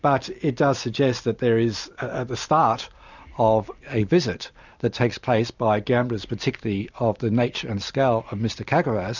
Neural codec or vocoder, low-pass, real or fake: none; 7.2 kHz; real